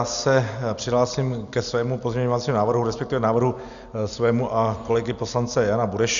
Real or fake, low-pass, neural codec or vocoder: real; 7.2 kHz; none